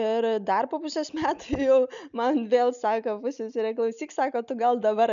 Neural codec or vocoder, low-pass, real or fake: none; 7.2 kHz; real